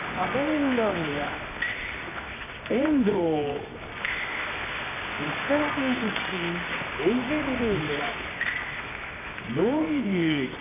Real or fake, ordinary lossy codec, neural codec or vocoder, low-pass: fake; none; codec, 24 kHz, 0.9 kbps, WavTokenizer, medium music audio release; 3.6 kHz